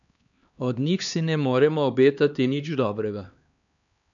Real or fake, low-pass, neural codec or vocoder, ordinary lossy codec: fake; 7.2 kHz; codec, 16 kHz, 2 kbps, X-Codec, HuBERT features, trained on LibriSpeech; MP3, 96 kbps